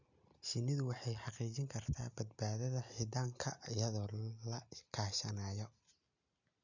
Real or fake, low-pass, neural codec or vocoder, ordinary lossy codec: real; 7.2 kHz; none; none